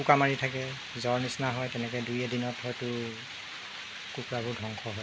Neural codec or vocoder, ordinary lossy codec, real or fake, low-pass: none; none; real; none